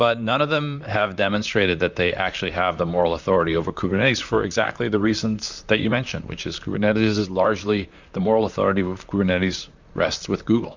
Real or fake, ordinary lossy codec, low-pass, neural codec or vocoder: fake; Opus, 64 kbps; 7.2 kHz; vocoder, 44.1 kHz, 128 mel bands, Pupu-Vocoder